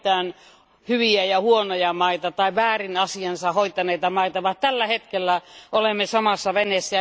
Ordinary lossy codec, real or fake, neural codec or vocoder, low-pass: none; real; none; none